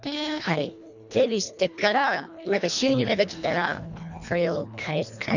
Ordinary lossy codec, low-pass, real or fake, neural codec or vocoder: none; 7.2 kHz; fake; codec, 24 kHz, 1.5 kbps, HILCodec